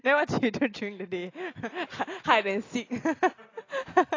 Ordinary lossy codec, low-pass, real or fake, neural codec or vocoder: AAC, 32 kbps; 7.2 kHz; real; none